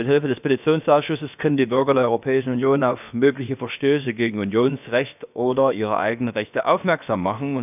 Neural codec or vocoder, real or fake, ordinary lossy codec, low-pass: codec, 16 kHz, about 1 kbps, DyCAST, with the encoder's durations; fake; none; 3.6 kHz